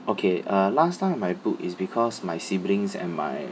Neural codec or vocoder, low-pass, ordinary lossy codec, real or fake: none; none; none; real